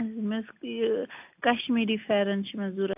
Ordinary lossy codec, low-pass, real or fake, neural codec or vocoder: AAC, 32 kbps; 3.6 kHz; real; none